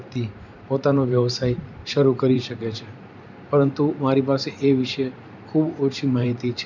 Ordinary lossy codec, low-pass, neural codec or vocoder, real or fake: none; 7.2 kHz; vocoder, 44.1 kHz, 128 mel bands every 512 samples, BigVGAN v2; fake